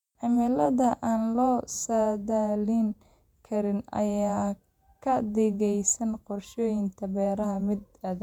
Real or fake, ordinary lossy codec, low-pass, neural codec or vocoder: fake; none; 19.8 kHz; vocoder, 48 kHz, 128 mel bands, Vocos